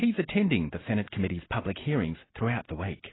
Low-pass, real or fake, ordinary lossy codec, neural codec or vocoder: 7.2 kHz; real; AAC, 16 kbps; none